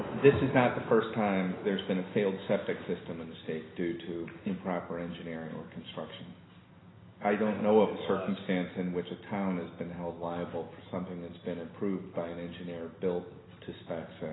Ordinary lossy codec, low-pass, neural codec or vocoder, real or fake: AAC, 16 kbps; 7.2 kHz; none; real